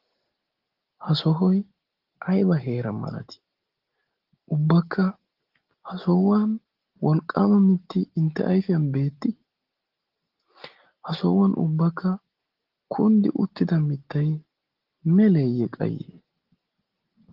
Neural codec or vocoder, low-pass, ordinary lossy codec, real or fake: none; 5.4 kHz; Opus, 16 kbps; real